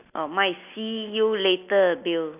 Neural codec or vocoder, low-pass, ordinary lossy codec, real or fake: none; 3.6 kHz; none; real